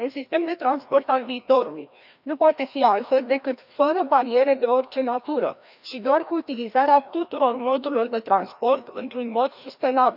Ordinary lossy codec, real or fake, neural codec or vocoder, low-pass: none; fake; codec, 16 kHz, 1 kbps, FreqCodec, larger model; 5.4 kHz